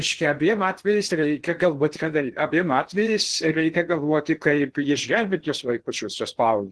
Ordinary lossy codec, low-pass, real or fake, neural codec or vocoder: Opus, 16 kbps; 10.8 kHz; fake; codec, 16 kHz in and 24 kHz out, 0.8 kbps, FocalCodec, streaming, 65536 codes